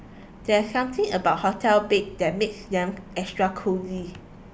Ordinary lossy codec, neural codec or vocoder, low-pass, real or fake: none; none; none; real